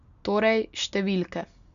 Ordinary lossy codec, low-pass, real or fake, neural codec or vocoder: none; 7.2 kHz; real; none